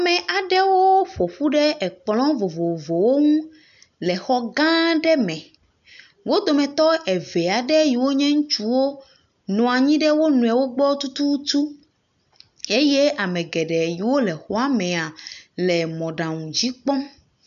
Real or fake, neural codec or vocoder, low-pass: real; none; 7.2 kHz